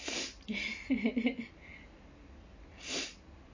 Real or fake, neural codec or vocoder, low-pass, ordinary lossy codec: real; none; 7.2 kHz; MP3, 32 kbps